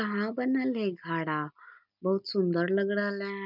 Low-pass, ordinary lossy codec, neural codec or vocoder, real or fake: 5.4 kHz; none; none; real